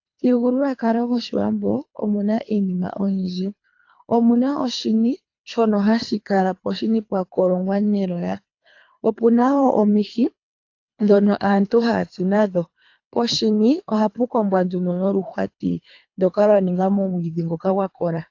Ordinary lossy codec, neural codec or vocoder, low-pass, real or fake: AAC, 48 kbps; codec, 24 kHz, 3 kbps, HILCodec; 7.2 kHz; fake